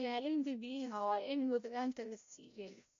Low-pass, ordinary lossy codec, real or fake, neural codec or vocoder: 7.2 kHz; MP3, 48 kbps; fake; codec, 16 kHz, 0.5 kbps, FreqCodec, larger model